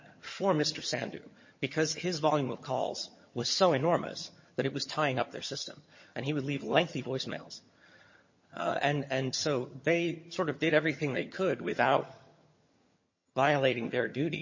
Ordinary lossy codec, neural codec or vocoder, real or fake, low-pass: MP3, 32 kbps; vocoder, 22.05 kHz, 80 mel bands, HiFi-GAN; fake; 7.2 kHz